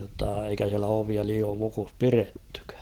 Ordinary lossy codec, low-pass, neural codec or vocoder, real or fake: Opus, 16 kbps; 19.8 kHz; none; real